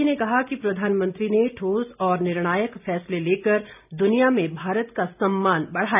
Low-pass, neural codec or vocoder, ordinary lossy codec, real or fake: 3.6 kHz; none; none; real